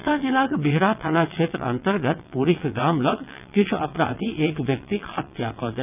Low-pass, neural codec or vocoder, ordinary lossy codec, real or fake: 3.6 kHz; vocoder, 22.05 kHz, 80 mel bands, WaveNeXt; none; fake